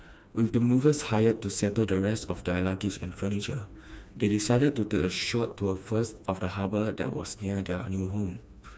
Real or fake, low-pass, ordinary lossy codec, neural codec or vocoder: fake; none; none; codec, 16 kHz, 2 kbps, FreqCodec, smaller model